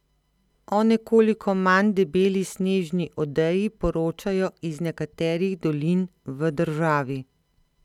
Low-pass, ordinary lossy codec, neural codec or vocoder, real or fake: 19.8 kHz; none; none; real